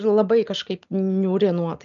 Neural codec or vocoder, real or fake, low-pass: none; real; 7.2 kHz